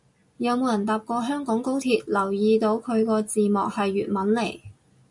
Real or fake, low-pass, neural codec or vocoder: real; 10.8 kHz; none